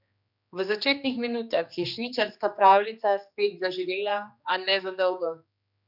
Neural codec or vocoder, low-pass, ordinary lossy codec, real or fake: codec, 16 kHz, 2 kbps, X-Codec, HuBERT features, trained on general audio; 5.4 kHz; none; fake